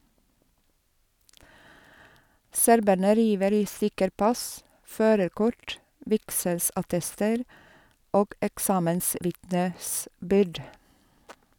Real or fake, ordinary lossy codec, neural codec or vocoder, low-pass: real; none; none; none